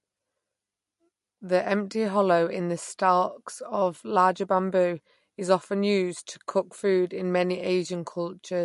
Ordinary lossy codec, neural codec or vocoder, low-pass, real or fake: MP3, 48 kbps; none; 14.4 kHz; real